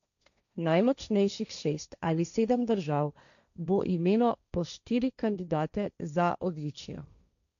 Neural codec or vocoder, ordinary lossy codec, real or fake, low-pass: codec, 16 kHz, 1.1 kbps, Voila-Tokenizer; none; fake; 7.2 kHz